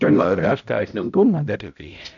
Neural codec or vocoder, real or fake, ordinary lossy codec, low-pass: codec, 16 kHz, 0.5 kbps, X-Codec, HuBERT features, trained on balanced general audio; fake; none; 7.2 kHz